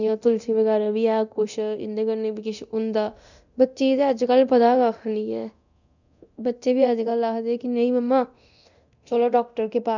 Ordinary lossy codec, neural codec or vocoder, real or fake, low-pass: none; codec, 24 kHz, 0.9 kbps, DualCodec; fake; 7.2 kHz